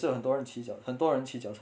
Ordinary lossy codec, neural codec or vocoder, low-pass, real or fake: none; none; none; real